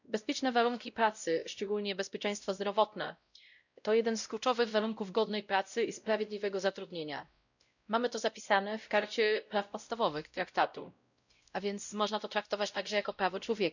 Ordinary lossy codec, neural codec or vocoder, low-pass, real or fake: none; codec, 16 kHz, 0.5 kbps, X-Codec, WavLM features, trained on Multilingual LibriSpeech; 7.2 kHz; fake